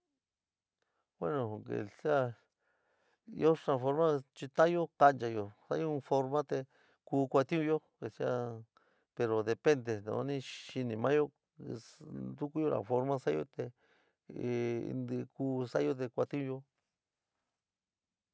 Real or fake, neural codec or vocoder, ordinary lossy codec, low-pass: real; none; none; none